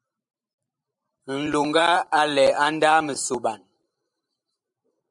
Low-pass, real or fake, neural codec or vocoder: 10.8 kHz; fake; vocoder, 44.1 kHz, 128 mel bands every 512 samples, BigVGAN v2